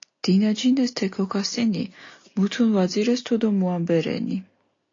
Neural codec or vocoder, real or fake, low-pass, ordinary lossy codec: none; real; 7.2 kHz; AAC, 32 kbps